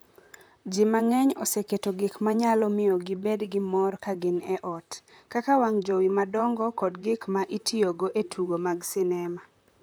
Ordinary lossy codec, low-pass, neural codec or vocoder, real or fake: none; none; vocoder, 44.1 kHz, 128 mel bands, Pupu-Vocoder; fake